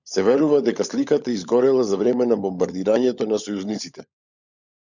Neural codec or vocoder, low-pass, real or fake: codec, 16 kHz, 16 kbps, FunCodec, trained on LibriTTS, 50 frames a second; 7.2 kHz; fake